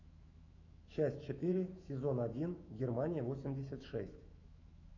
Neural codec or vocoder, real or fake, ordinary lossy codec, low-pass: autoencoder, 48 kHz, 128 numbers a frame, DAC-VAE, trained on Japanese speech; fake; AAC, 48 kbps; 7.2 kHz